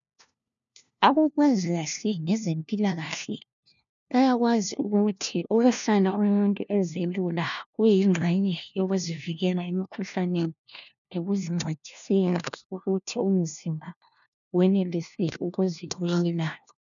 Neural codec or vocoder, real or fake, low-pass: codec, 16 kHz, 1 kbps, FunCodec, trained on LibriTTS, 50 frames a second; fake; 7.2 kHz